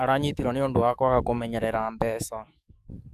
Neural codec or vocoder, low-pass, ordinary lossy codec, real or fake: codec, 44.1 kHz, 7.8 kbps, DAC; 14.4 kHz; none; fake